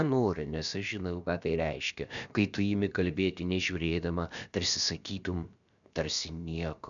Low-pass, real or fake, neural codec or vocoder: 7.2 kHz; fake; codec, 16 kHz, about 1 kbps, DyCAST, with the encoder's durations